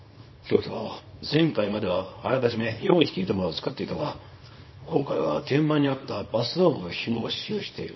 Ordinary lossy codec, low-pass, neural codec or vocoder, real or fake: MP3, 24 kbps; 7.2 kHz; codec, 24 kHz, 0.9 kbps, WavTokenizer, small release; fake